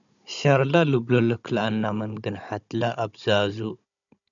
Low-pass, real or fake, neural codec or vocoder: 7.2 kHz; fake; codec, 16 kHz, 16 kbps, FunCodec, trained on Chinese and English, 50 frames a second